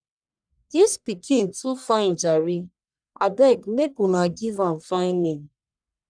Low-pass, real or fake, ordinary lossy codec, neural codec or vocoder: 9.9 kHz; fake; none; codec, 44.1 kHz, 1.7 kbps, Pupu-Codec